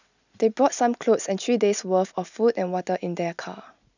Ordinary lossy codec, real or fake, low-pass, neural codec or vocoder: none; real; 7.2 kHz; none